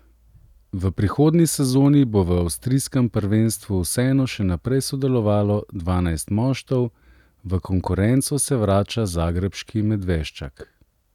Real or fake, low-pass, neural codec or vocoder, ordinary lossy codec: real; 19.8 kHz; none; none